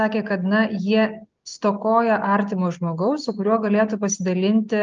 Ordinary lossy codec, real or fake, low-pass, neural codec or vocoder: Opus, 24 kbps; real; 7.2 kHz; none